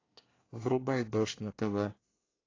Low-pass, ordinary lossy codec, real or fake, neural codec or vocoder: 7.2 kHz; AAC, 32 kbps; fake; codec, 24 kHz, 1 kbps, SNAC